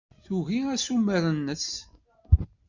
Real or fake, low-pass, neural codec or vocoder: real; 7.2 kHz; none